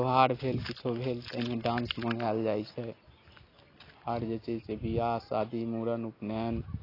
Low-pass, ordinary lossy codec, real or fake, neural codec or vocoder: 5.4 kHz; AAC, 24 kbps; real; none